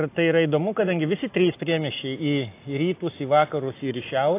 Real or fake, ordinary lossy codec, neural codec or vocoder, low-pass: real; AAC, 24 kbps; none; 3.6 kHz